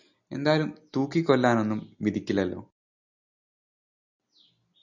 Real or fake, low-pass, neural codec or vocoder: real; 7.2 kHz; none